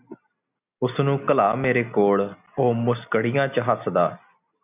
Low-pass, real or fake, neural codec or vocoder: 3.6 kHz; real; none